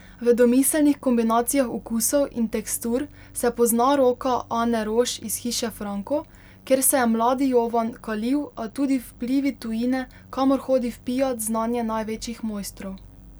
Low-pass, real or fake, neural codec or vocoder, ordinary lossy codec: none; real; none; none